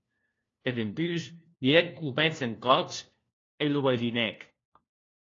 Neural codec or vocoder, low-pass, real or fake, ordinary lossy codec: codec, 16 kHz, 1 kbps, FunCodec, trained on LibriTTS, 50 frames a second; 7.2 kHz; fake; AAC, 32 kbps